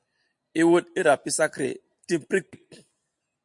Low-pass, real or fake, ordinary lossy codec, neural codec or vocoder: 10.8 kHz; real; MP3, 64 kbps; none